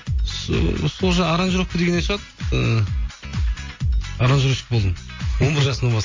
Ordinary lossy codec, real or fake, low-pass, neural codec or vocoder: MP3, 32 kbps; real; 7.2 kHz; none